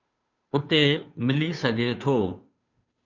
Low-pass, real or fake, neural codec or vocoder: 7.2 kHz; fake; codec, 16 kHz, 2 kbps, FunCodec, trained on Chinese and English, 25 frames a second